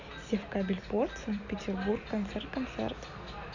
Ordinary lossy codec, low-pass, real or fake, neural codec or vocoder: none; 7.2 kHz; real; none